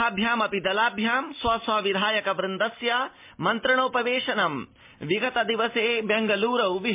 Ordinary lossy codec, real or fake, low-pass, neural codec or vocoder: MP3, 32 kbps; real; 3.6 kHz; none